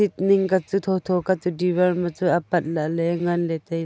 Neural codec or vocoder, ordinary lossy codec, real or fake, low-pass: none; none; real; none